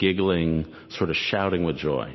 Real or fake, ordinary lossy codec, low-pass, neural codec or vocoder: real; MP3, 24 kbps; 7.2 kHz; none